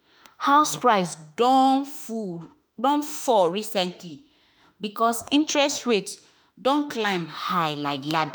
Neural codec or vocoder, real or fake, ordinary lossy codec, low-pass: autoencoder, 48 kHz, 32 numbers a frame, DAC-VAE, trained on Japanese speech; fake; none; none